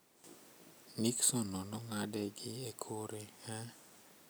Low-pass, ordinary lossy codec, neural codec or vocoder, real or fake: none; none; none; real